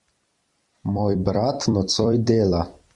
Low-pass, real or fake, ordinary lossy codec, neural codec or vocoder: 10.8 kHz; fake; Opus, 64 kbps; vocoder, 44.1 kHz, 128 mel bands every 256 samples, BigVGAN v2